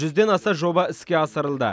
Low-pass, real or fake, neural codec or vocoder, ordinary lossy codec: none; real; none; none